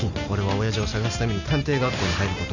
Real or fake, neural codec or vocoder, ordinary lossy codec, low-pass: real; none; none; 7.2 kHz